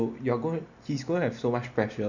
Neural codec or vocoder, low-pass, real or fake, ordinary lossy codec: none; 7.2 kHz; real; none